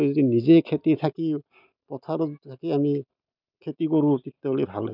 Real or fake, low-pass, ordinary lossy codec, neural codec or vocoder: real; 5.4 kHz; none; none